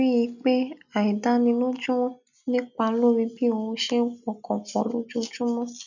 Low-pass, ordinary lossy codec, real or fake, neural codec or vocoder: 7.2 kHz; none; real; none